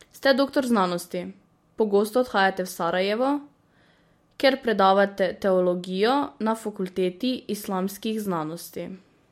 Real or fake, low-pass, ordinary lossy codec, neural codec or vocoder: real; 19.8 kHz; MP3, 64 kbps; none